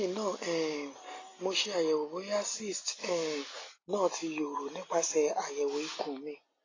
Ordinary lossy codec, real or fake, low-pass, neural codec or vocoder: AAC, 32 kbps; real; 7.2 kHz; none